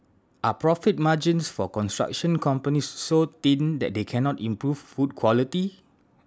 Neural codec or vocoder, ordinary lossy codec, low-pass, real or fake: none; none; none; real